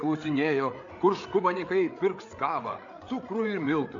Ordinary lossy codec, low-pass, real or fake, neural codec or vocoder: AAC, 48 kbps; 7.2 kHz; fake; codec, 16 kHz, 8 kbps, FreqCodec, larger model